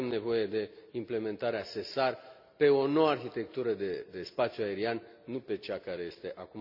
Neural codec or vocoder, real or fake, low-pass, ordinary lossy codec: none; real; 5.4 kHz; none